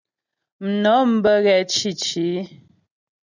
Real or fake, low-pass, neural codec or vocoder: real; 7.2 kHz; none